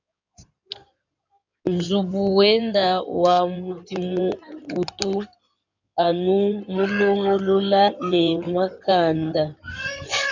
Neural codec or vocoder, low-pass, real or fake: codec, 16 kHz in and 24 kHz out, 2.2 kbps, FireRedTTS-2 codec; 7.2 kHz; fake